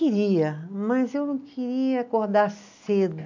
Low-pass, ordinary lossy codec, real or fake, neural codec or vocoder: 7.2 kHz; AAC, 48 kbps; real; none